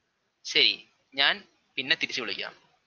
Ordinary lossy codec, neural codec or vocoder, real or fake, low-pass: Opus, 24 kbps; none; real; 7.2 kHz